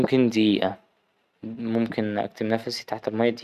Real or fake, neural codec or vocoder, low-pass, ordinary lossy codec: real; none; 14.4 kHz; none